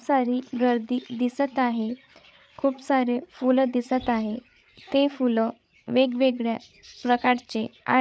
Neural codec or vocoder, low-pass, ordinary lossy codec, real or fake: codec, 16 kHz, 8 kbps, FreqCodec, larger model; none; none; fake